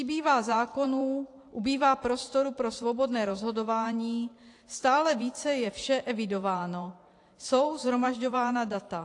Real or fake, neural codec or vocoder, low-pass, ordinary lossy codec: fake; vocoder, 44.1 kHz, 128 mel bands every 512 samples, BigVGAN v2; 10.8 kHz; AAC, 48 kbps